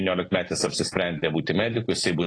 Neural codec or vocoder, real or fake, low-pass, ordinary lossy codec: none; real; 9.9 kHz; AAC, 32 kbps